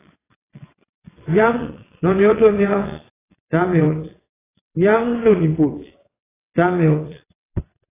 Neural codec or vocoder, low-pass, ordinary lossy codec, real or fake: vocoder, 22.05 kHz, 80 mel bands, WaveNeXt; 3.6 kHz; AAC, 16 kbps; fake